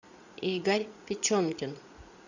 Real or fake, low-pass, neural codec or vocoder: fake; 7.2 kHz; vocoder, 44.1 kHz, 80 mel bands, Vocos